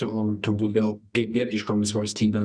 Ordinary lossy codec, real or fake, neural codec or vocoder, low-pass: Opus, 64 kbps; fake; codec, 24 kHz, 0.9 kbps, WavTokenizer, medium music audio release; 9.9 kHz